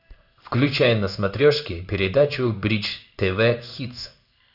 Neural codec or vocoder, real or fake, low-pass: codec, 16 kHz in and 24 kHz out, 1 kbps, XY-Tokenizer; fake; 5.4 kHz